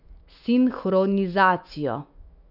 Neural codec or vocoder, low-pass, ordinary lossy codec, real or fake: autoencoder, 48 kHz, 128 numbers a frame, DAC-VAE, trained on Japanese speech; 5.4 kHz; none; fake